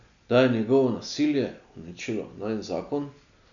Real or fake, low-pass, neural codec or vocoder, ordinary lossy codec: real; 7.2 kHz; none; none